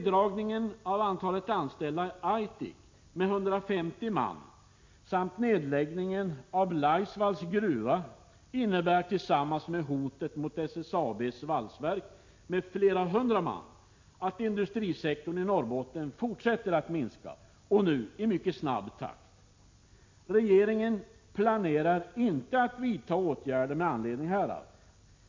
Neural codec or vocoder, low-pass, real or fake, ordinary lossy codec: none; 7.2 kHz; real; MP3, 48 kbps